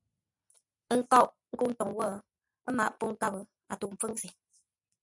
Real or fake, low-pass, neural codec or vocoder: real; 10.8 kHz; none